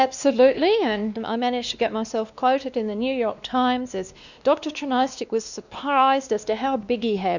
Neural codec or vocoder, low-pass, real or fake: codec, 16 kHz, 2 kbps, X-Codec, WavLM features, trained on Multilingual LibriSpeech; 7.2 kHz; fake